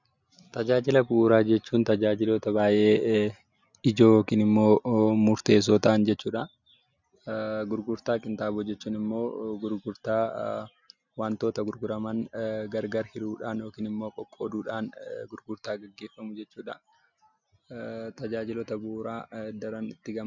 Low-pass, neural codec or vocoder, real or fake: 7.2 kHz; none; real